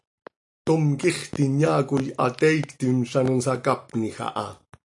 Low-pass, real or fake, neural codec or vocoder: 10.8 kHz; real; none